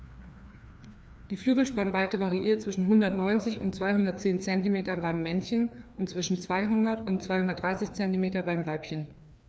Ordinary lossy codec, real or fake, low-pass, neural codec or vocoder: none; fake; none; codec, 16 kHz, 2 kbps, FreqCodec, larger model